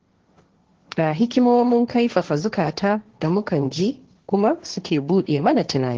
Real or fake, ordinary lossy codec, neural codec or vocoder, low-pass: fake; Opus, 16 kbps; codec, 16 kHz, 1.1 kbps, Voila-Tokenizer; 7.2 kHz